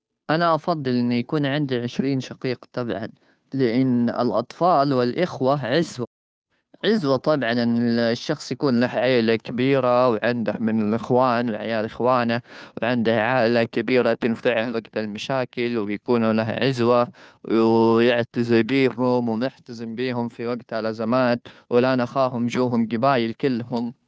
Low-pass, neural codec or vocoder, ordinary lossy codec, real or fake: none; codec, 16 kHz, 2 kbps, FunCodec, trained on Chinese and English, 25 frames a second; none; fake